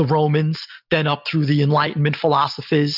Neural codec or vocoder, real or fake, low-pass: none; real; 5.4 kHz